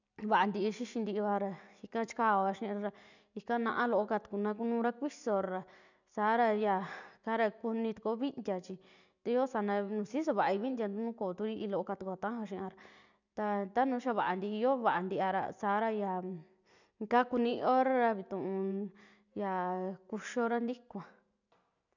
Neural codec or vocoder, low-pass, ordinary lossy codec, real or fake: none; 7.2 kHz; none; real